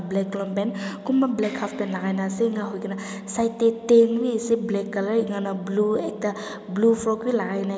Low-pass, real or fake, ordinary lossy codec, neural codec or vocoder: none; real; none; none